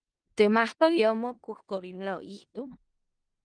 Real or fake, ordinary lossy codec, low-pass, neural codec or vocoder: fake; Opus, 24 kbps; 9.9 kHz; codec, 16 kHz in and 24 kHz out, 0.4 kbps, LongCat-Audio-Codec, four codebook decoder